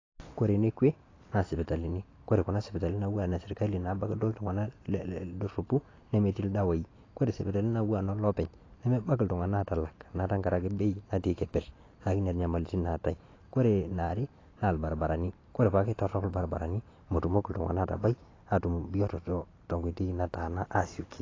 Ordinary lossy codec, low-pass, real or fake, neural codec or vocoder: AAC, 32 kbps; 7.2 kHz; real; none